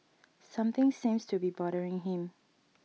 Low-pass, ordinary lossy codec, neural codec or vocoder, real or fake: none; none; none; real